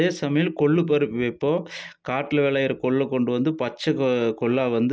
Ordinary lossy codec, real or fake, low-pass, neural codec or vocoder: none; real; none; none